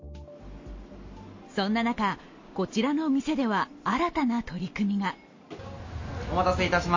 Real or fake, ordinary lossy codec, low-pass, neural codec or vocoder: real; MP3, 32 kbps; 7.2 kHz; none